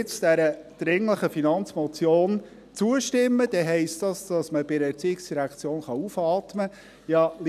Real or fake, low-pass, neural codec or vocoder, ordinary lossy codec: real; 14.4 kHz; none; none